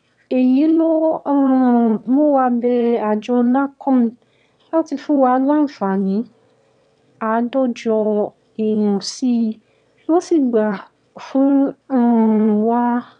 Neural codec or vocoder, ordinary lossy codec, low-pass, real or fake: autoencoder, 22.05 kHz, a latent of 192 numbers a frame, VITS, trained on one speaker; none; 9.9 kHz; fake